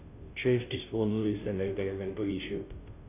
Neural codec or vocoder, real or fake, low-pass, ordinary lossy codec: codec, 16 kHz, 0.5 kbps, FunCodec, trained on Chinese and English, 25 frames a second; fake; 3.6 kHz; none